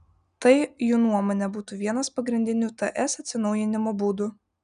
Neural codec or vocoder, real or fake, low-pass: none; real; 9.9 kHz